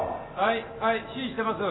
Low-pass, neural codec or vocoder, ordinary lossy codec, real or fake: 7.2 kHz; none; AAC, 16 kbps; real